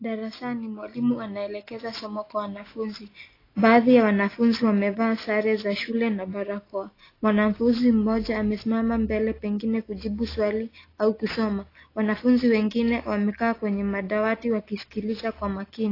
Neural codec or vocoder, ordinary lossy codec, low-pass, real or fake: none; AAC, 24 kbps; 5.4 kHz; real